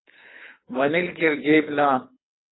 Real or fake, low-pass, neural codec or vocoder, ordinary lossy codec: fake; 7.2 kHz; codec, 24 kHz, 3 kbps, HILCodec; AAC, 16 kbps